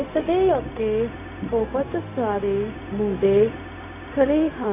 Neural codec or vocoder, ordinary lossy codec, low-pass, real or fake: codec, 16 kHz, 0.4 kbps, LongCat-Audio-Codec; none; 3.6 kHz; fake